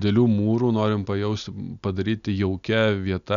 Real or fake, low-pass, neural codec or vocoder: real; 7.2 kHz; none